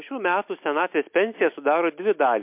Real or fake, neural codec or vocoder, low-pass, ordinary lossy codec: real; none; 3.6 kHz; MP3, 32 kbps